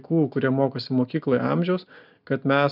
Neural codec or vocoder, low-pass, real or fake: none; 5.4 kHz; real